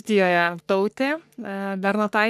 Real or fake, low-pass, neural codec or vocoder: fake; 14.4 kHz; codec, 44.1 kHz, 3.4 kbps, Pupu-Codec